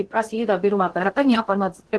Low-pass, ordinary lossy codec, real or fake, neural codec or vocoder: 10.8 kHz; Opus, 16 kbps; fake; codec, 16 kHz in and 24 kHz out, 0.6 kbps, FocalCodec, streaming, 2048 codes